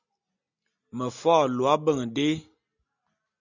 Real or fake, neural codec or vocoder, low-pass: real; none; 7.2 kHz